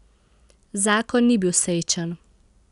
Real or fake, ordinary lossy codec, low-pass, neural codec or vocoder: real; none; 10.8 kHz; none